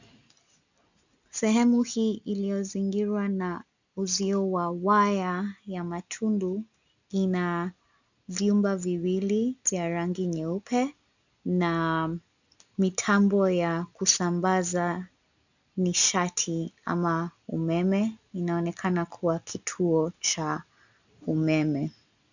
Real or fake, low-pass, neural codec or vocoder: real; 7.2 kHz; none